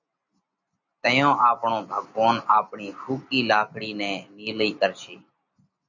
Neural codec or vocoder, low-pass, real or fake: none; 7.2 kHz; real